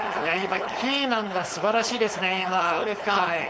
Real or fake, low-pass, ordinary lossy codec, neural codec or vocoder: fake; none; none; codec, 16 kHz, 4.8 kbps, FACodec